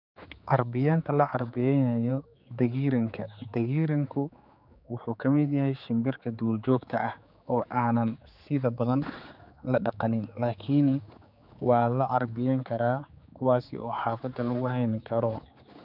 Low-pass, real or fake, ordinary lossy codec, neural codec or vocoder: 5.4 kHz; fake; none; codec, 16 kHz, 4 kbps, X-Codec, HuBERT features, trained on general audio